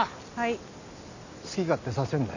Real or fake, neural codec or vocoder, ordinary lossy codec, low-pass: real; none; none; 7.2 kHz